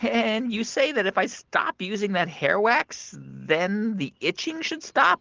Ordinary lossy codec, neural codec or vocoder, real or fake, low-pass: Opus, 16 kbps; none; real; 7.2 kHz